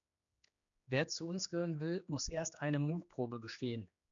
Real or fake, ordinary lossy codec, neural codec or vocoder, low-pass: fake; MP3, 64 kbps; codec, 16 kHz, 2 kbps, X-Codec, HuBERT features, trained on general audio; 7.2 kHz